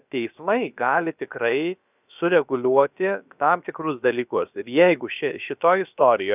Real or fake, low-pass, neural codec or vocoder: fake; 3.6 kHz; codec, 16 kHz, about 1 kbps, DyCAST, with the encoder's durations